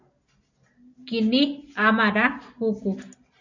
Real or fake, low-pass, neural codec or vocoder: real; 7.2 kHz; none